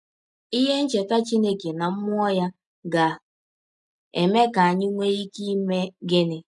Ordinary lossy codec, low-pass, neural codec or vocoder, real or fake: none; 10.8 kHz; none; real